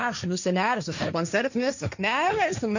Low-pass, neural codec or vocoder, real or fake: 7.2 kHz; codec, 16 kHz, 1.1 kbps, Voila-Tokenizer; fake